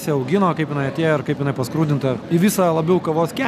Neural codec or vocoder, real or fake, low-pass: none; real; 14.4 kHz